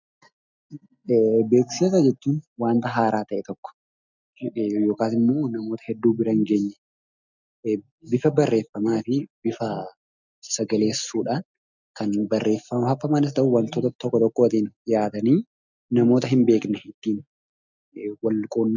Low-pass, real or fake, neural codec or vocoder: 7.2 kHz; real; none